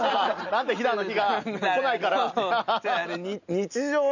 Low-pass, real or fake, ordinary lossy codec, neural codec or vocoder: 7.2 kHz; real; none; none